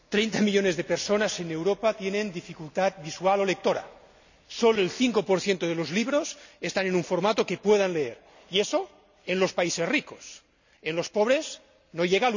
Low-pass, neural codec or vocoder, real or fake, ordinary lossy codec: 7.2 kHz; none; real; none